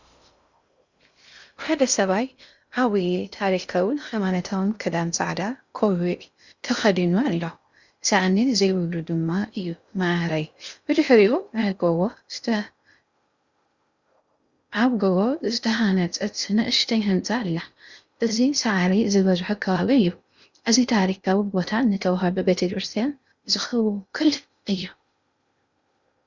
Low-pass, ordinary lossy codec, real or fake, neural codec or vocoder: 7.2 kHz; Opus, 64 kbps; fake; codec, 16 kHz in and 24 kHz out, 0.6 kbps, FocalCodec, streaming, 2048 codes